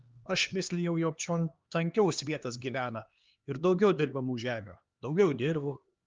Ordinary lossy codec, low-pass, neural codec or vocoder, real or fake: Opus, 32 kbps; 7.2 kHz; codec, 16 kHz, 2 kbps, X-Codec, HuBERT features, trained on LibriSpeech; fake